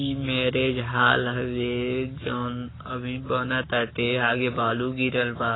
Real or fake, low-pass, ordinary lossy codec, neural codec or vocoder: fake; 7.2 kHz; AAC, 16 kbps; codec, 44.1 kHz, 7.8 kbps, Pupu-Codec